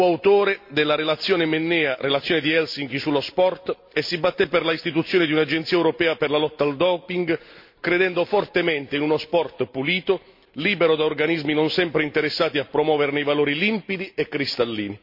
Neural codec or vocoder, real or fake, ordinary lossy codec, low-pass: none; real; MP3, 32 kbps; 5.4 kHz